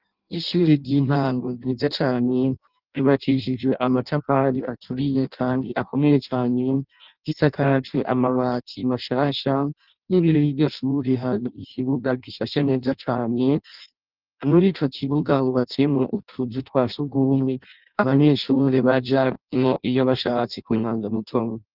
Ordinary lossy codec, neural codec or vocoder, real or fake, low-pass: Opus, 24 kbps; codec, 16 kHz in and 24 kHz out, 0.6 kbps, FireRedTTS-2 codec; fake; 5.4 kHz